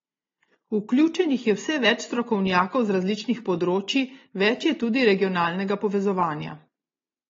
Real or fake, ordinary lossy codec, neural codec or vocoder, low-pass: real; AAC, 24 kbps; none; 7.2 kHz